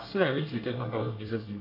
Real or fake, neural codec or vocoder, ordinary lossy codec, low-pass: fake; codec, 24 kHz, 1 kbps, SNAC; AAC, 32 kbps; 5.4 kHz